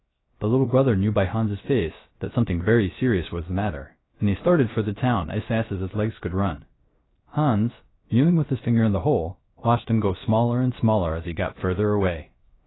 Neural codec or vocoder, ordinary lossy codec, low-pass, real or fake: codec, 16 kHz, 0.3 kbps, FocalCodec; AAC, 16 kbps; 7.2 kHz; fake